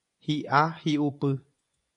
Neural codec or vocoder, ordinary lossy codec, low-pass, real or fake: vocoder, 24 kHz, 100 mel bands, Vocos; MP3, 96 kbps; 10.8 kHz; fake